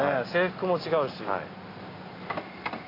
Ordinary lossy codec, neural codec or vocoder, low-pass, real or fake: AAC, 24 kbps; none; 5.4 kHz; real